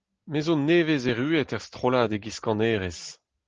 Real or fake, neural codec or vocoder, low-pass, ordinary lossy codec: real; none; 7.2 kHz; Opus, 16 kbps